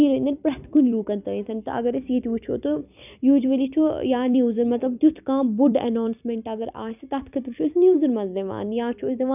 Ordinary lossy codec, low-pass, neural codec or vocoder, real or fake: none; 3.6 kHz; vocoder, 44.1 kHz, 128 mel bands every 256 samples, BigVGAN v2; fake